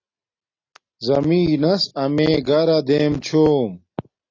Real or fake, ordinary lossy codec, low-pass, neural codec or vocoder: real; AAC, 32 kbps; 7.2 kHz; none